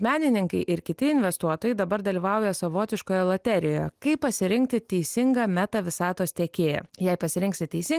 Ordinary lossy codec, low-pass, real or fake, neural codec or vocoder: Opus, 24 kbps; 14.4 kHz; real; none